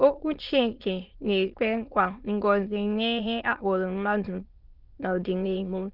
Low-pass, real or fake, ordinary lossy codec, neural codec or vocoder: 5.4 kHz; fake; Opus, 32 kbps; autoencoder, 22.05 kHz, a latent of 192 numbers a frame, VITS, trained on many speakers